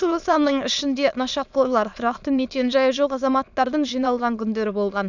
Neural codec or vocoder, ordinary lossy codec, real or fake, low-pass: autoencoder, 22.05 kHz, a latent of 192 numbers a frame, VITS, trained on many speakers; none; fake; 7.2 kHz